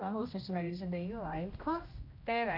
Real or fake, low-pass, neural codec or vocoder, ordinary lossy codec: fake; 5.4 kHz; codec, 16 kHz, 1 kbps, X-Codec, HuBERT features, trained on general audio; none